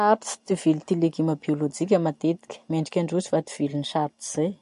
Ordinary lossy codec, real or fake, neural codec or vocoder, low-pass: MP3, 48 kbps; real; none; 14.4 kHz